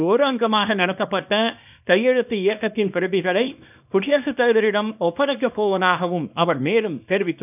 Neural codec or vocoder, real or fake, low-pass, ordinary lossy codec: codec, 24 kHz, 0.9 kbps, WavTokenizer, small release; fake; 3.6 kHz; none